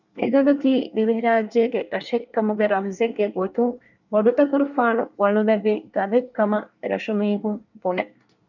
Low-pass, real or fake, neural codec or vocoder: 7.2 kHz; fake; codec, 24 kHz, 1 kbps, SNAC